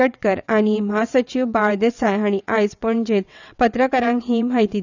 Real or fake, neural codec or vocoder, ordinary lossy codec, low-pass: fake; vocoder, 22.05 kHz, 80 mel bands, WaveNeXt; none; 7.2 kHz